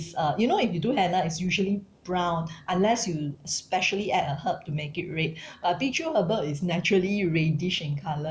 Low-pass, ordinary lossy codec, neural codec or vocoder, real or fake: none; none; none; real